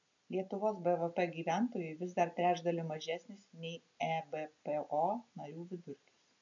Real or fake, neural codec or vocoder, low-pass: real; none; 7.2 kHz